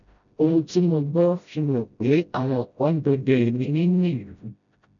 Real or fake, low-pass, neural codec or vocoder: fake; 7.2 kHz; codec, 16 kHz, 0.5 kbps, FreqCodec, smaller model